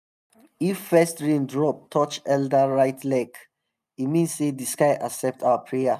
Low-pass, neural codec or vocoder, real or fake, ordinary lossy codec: 14.4 kHz; none; real; none